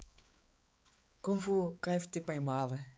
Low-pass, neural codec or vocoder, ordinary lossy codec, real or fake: none; codec, 16 kHz, 4 kbps, X-Codec, HuBERT features, trained on balanced general audio; none; fake